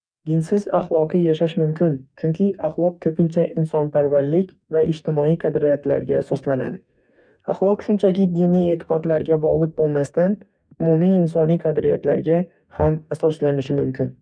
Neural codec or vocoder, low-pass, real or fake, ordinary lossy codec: codec, 44.1 kHz, 2.6 kbps, DAC; 9.9 kHz; fake; none